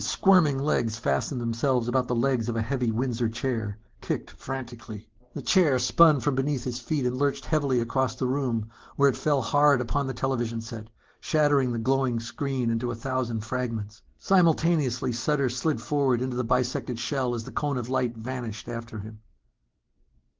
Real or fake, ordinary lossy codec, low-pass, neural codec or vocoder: real; Opus, 16 kbps; 7.2 kHz; none